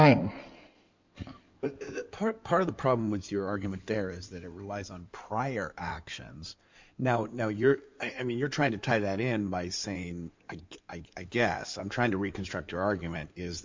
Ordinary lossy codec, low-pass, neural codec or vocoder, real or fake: MP3, 64 kbps; 7.2 kHz; codec, 16 kHz in and 24 kHz out, 2.2 kbps, FireRedTTS-2 codec; fake